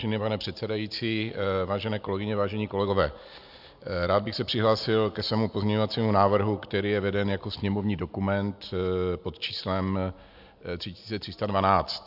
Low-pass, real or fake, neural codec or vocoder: 5.4 kHz; real; none